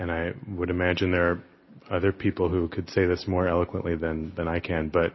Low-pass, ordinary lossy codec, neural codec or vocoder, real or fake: 7.2 kHz; MP3, 24 kbps; none; real